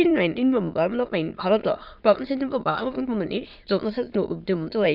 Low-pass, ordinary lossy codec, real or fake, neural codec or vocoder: 5.4 kHz; none; fake; autoencoder, 22.05 kHz, a latent of 192 numbers a frame, VITS, trained on many speakers